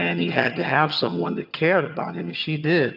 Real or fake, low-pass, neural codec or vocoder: fake; 5.4 kHz; vocoder, 22.05 kHz, 80 mel bands, HiFi-GAN